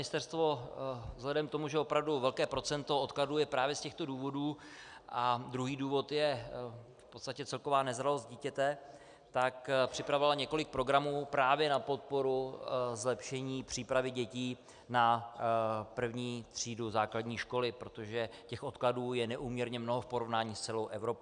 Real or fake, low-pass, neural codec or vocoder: real; 9.9 kHz; none